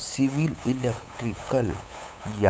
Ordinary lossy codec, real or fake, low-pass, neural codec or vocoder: none; fake; none; codec, 16 kHz, 8 kbps, FunCodec, trained on LibriTTS, 25 frames a second